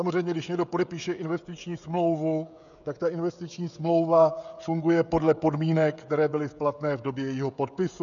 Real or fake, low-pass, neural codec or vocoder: fake; 7.2 kHz; codec, 16 kHz, 16 kbps, FreqCodec, smaller model